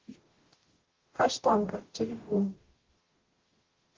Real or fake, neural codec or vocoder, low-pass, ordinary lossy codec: fake; codec, 44.1 kHz, 0.9 kbps, DAC; 7.2 kHz; Opus, 16 kbps